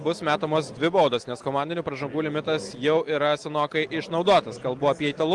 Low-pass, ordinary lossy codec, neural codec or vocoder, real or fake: 10.8 kHz; Opus, 24 kbps; none; real